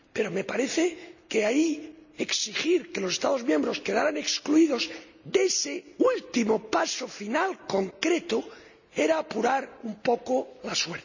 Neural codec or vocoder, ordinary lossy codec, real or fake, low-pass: none; none; real; 7.2 kHz